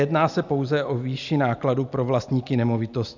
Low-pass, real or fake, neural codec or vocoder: 7.2 kHz; real; none